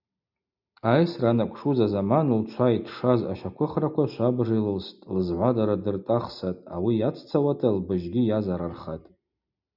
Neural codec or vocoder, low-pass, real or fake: none; 5.4 kHz; real